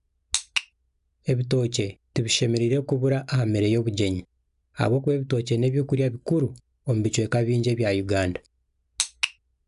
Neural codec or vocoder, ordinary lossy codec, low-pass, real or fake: none; none; 10.8 kHz; real